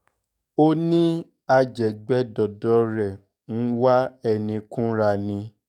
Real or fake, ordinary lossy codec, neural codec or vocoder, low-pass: fake; none; codec, 44.1 kHz, 7.8 kbps, DAC; 19.8 kHz